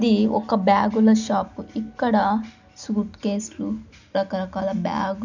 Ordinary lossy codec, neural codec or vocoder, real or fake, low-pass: none; none; real; 7.2 kHz